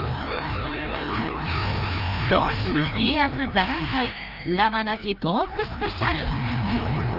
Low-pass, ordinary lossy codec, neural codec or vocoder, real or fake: 5.4 kHz; Opus, 32 kbps; codec, 16 kHz, 1 kbps, FreqCodec, larger model; fake